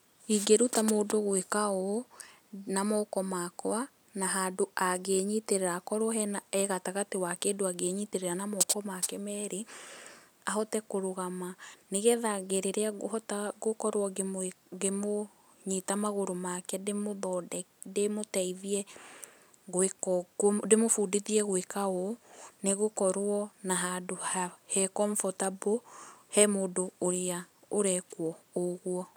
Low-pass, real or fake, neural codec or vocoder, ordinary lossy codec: none; real; none; none